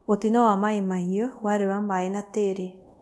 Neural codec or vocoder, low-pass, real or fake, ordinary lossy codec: codec, 24 kHz, 0.5 kbps, DualCodec; none; fake; none